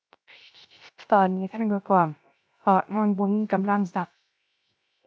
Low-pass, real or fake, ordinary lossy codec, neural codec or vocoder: none; fake; none; codec, 16 kHz, 0.3 kbps, FocalCodec